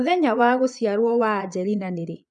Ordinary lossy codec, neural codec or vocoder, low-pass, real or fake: none; vocoder, 22.05 kHz, 80 mel bands, Vocos; 9.9 kHz; fake